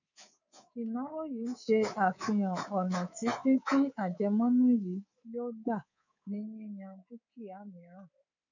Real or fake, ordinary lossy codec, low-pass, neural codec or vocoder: fake; none; 7.2 kHz; codec, 24 kHz, 3.1 kbps, DualCodec